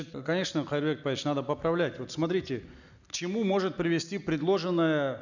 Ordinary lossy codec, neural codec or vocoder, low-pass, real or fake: none; none; 7.2 kHz; real